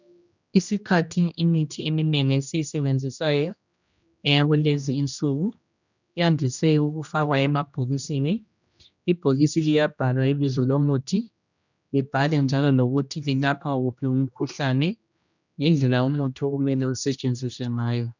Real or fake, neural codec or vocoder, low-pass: fake; codec, 16 kHz, 1 kbps, X-Codec, HuBERT features, trained on general audio; 7.2 kHz